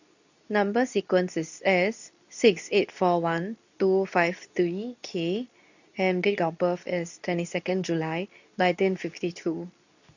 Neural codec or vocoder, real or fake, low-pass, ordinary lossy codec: codec, 24 kHz, 0.9 kbps, WavTokenizer, medium speech release version 2; fake; 7.2 kHz; none